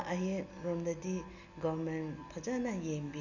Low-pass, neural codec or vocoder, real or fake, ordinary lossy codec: 7.2 kHz; none; real; none